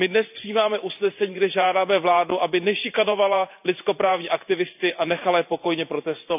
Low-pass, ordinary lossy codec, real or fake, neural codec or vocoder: 3.6 kHz; none; fake; vocoder, 44.1 kHz, 128 mel bands every 512 samples, BigVGAN v2